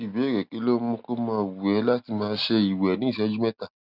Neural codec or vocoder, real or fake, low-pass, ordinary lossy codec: none; real; 5.4 kHz; none